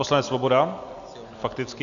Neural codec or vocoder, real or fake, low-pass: none; real; 7.2 kHz